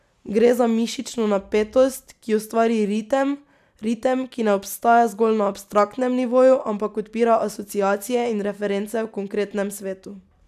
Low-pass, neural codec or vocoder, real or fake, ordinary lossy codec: 14.4 kHz; none; real; none